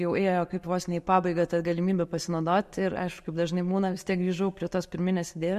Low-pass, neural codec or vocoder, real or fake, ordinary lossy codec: 14.4 kHz; none; real; MP3, 64 kbps